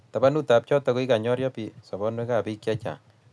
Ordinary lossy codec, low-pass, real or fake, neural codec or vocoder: none; none; real; none